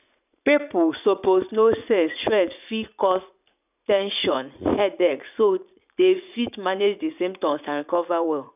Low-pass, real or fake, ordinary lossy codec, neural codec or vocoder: 3.6 kHz; fake; none; vocoder, 44.1 kHz, 80 mel bands, Vocos